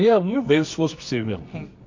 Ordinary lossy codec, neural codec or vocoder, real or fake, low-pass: MP3, 48 kbps; codec, 24 kHz, 0.9 kbps, WavTokenizer, medium music audio release; fake; 7.2 kHz